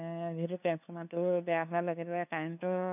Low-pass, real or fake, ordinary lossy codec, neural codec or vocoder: 3.6 kHz; fake; none; codec, 16 kHz, 2 kbps, FunCodec, trained on LibriTTS, 25 frames a second